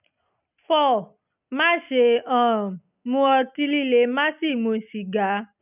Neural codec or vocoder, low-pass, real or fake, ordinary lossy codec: none; 3.6 kHz; real; none